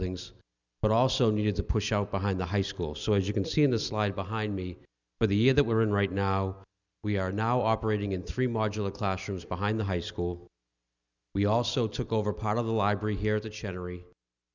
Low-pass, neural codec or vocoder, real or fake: 7.2 kHz; none; real